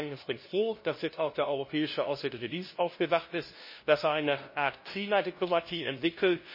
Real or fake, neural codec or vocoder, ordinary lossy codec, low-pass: fake; codec, 16 kHz, 0.5 kbps, FunCodec, trained on LibriTTS, 25 frames a second; MP3, 24 kbps; 5.4 kHz